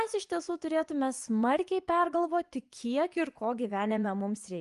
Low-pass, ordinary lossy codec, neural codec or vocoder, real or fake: 10.8 kHz; Opus, 32 kbps; vocoder, 24 kHz, 100 mel bands, Vocos; fake